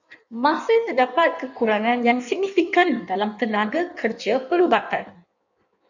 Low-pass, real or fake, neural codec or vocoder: 7.2 kHz; fake; codec, 16 kHz in and 24 kHz out, 1.1 kbps, FireRedTTS-2 codec